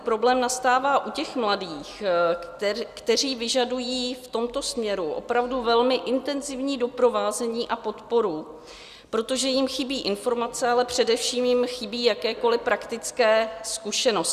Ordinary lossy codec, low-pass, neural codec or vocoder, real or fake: Opus, 64 kbps; 14.4 kHz; none; real